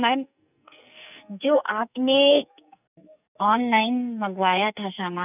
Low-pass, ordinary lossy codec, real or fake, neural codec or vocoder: 3.6 kHz; none; fake; codec, 44.1 kHz, 2.6 kbps, SNAC